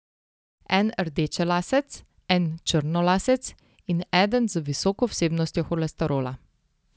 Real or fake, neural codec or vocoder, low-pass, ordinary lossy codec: real; none; none; none